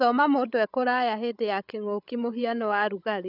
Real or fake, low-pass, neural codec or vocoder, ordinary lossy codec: fake; 5.4 kHz; codec, 16 kHz, 16 kbps, FreqCodec, larger model; none